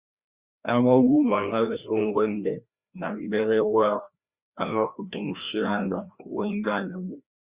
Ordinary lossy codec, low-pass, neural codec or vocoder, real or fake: Opus, 64 kbps; 3.6 kHz; codec, 16 kHz, 1 kbps, FreqCodec, larger model; fake